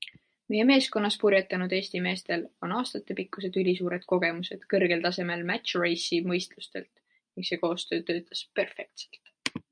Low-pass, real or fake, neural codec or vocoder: 9.9 kHz; real; none